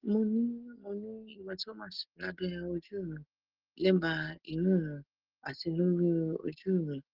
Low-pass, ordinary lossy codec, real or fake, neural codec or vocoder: 5.4 kHz; Opus, 16 kbps; real; none